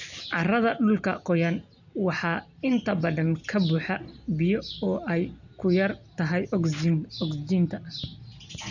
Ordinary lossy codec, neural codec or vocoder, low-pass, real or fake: none; none; 7.2 kHz; real